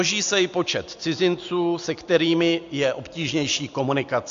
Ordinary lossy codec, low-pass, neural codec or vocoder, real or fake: AAC, 64 kbps; 7.2 kHz; none; real